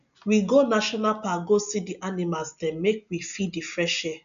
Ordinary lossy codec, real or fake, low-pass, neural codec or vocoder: none; real; 7.2 kHz; none